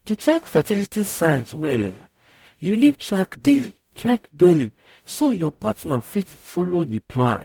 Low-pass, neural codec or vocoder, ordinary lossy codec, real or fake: 19.8 kHz; codec, 44.1 kHz, 0.9 kbps, DAC; MP3, 96 kbps; fake